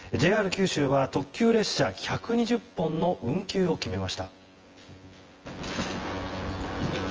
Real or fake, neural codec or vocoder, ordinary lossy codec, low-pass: fake; vocoder, 24 kHz, 100 mel bands, Vocos; Opus, 24 kbps; 7.2 kHz